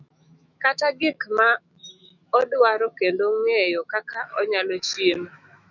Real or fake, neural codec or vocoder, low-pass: fake; autoencoder, 48 kHz, 128 numbers a frame, DAC-VAE, trained on Japanese speech; 7.2 kHz